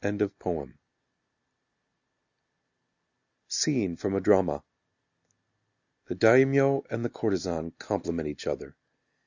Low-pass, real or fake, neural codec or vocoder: 7.2 kHz; real; none